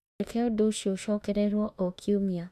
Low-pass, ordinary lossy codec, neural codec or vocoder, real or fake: 14.4 kHz; none; autoencoder, 48 kHz, 32 numbers a frame, DAC-VAE, trained on Japanese speech; fake